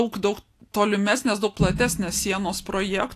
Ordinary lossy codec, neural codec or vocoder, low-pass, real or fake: AAC, 64 kbps; none; 14.4 kHz; real